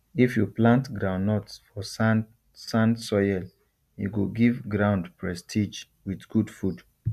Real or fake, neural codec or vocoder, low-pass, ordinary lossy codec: real; none; 14.4 kHz; MP3, 96 kbps